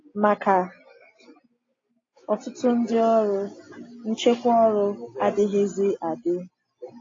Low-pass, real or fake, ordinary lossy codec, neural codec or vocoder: 7.2 kHz; real; AAC, 32 kbps; none